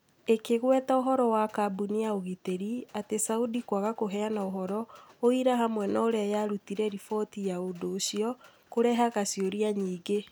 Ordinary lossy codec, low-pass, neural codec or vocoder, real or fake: none; none; none; real